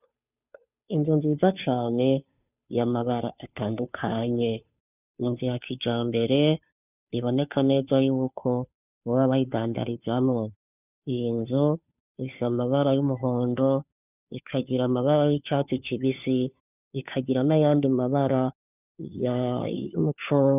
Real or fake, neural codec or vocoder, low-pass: fake; codec, 16 kHz, 2 kbps, FunCodec, trained on Chinese and English, 25 frames a second; 3.6 kHz